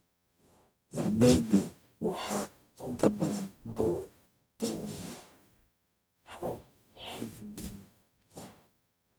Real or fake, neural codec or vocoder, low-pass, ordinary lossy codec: fake; codec, 44.1 kHz, 0.9 kbps, DAC; none; none